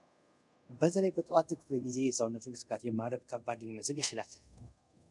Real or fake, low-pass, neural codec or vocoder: fake; 10.8 kHz; codec, 24 kHz, 0.5 kbps, DualCodec